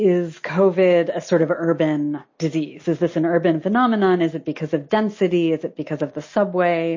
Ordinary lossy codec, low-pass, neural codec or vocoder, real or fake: MP3, 32 kbps; 7.2 kHz; none; real